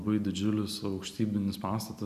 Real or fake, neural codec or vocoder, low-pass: fake; vocoder, 44.1 kHz, 128 mel bands every 256 samples, BigVGAN v2; 14.4 kHz